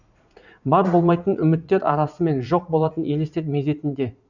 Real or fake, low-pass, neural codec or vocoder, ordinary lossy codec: fake; 7.2 kHz; vocoder, 44.1 kHz, 80 mel bands, Vocos; none